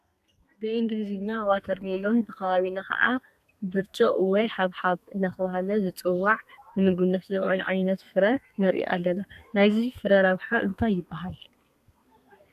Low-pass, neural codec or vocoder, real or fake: 14.4 kHz; codec, 32 kHz, 1.9 kbps, SNAC; fake